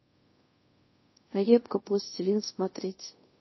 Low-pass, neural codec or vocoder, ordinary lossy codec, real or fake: 7.2 kHz; codec, 24 kHz, 0.5 kbps, DualCodec; MP3, 24 kbps; fake